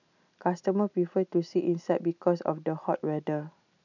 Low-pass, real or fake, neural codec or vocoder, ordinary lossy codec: 7.2 kHz; real; none; none